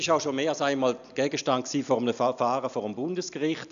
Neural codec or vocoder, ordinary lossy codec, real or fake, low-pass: none; none; real; 7.2 kHz